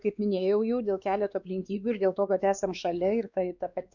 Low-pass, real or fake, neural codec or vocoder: 7.2 kHz; fake; codec, 16 kHz, 2 kbps, X-Codec, WavLM features, trained on Multilingual LibriSpeech